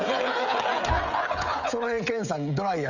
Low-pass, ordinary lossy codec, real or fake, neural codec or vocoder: 7.2 kHz; none; fake; codec, 16 kHz, 16 kbps, FreqCodec, smaller model